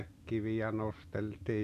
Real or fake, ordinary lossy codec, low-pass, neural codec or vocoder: real; none; 14.4 kHz; none